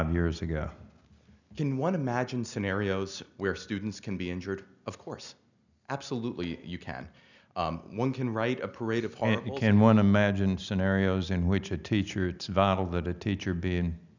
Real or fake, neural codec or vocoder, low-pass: real; none; 7.2 kHz